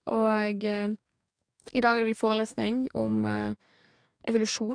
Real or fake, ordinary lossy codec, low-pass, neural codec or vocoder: fake; none; 9.9 kHz; codec, 44.1 kHz, 2.6 kbps, DAC